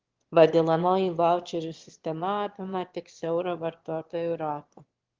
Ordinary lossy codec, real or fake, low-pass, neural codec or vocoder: Opus, 16 kbps; fake; 7.2 kHz; autoencoder, 22.05 kHz, a latent of 192 numbers a frame, VITS, trained on one speaker